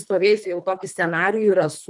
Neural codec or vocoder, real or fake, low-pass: codec, 24 kHz, 3 kbps, HILCodec; fake; 10.8 kHz